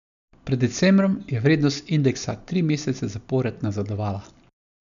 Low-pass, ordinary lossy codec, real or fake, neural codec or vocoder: 7.2 kHz; none; real; none